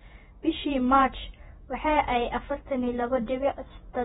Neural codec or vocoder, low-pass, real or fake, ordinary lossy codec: vocoder, 48 kHz, 128 mel bands, Vocos; 19.8 kHz; fake; AAC, 16 kbps